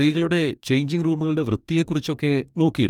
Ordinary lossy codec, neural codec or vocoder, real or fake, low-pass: none; codec, 44.1 kHz, 2.6 kbps, DAC; fake; 19.8 kHz